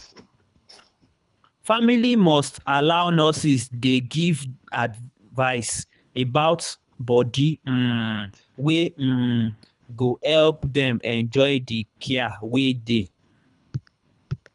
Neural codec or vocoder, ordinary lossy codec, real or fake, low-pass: codec, 24 kHz, 3 kbps, HILCodec; none; fake; 10.8 kHz